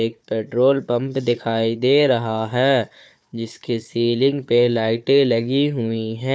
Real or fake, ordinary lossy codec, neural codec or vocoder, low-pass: fake; none; codec, 16 kHz, 4 kbps, FunCodec, trained on Chinese and English, 50 frames a second; none